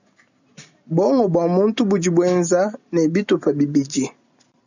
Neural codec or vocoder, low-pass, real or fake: none; 7.2 kHz; real